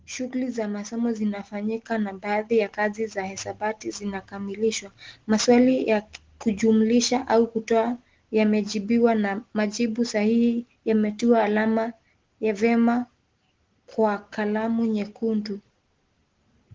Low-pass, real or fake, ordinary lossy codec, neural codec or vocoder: 7.2 kHz; real; Opus, 16 kbps; none